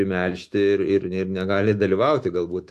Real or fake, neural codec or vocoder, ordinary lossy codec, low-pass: fake; autoencoder, 48 kHz, 128 numbers a frame, DAC-VAE, trained on Japanese speech; AAC, 64 kbps; 14.4 kHz